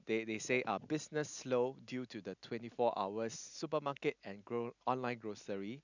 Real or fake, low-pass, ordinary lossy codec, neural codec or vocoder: real; 7.2 kHz; none; none